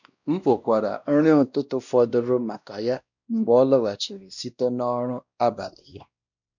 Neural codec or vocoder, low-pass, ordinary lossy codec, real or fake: codec, 16 kHz, 1 kbps, X-Codec, WavLM features, trained on Multilingual LibriSpeech; 7.2 kHz; none; fake